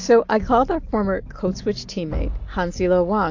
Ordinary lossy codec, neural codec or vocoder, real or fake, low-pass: AAC, 48 kbps; none; real; 7.2 kHz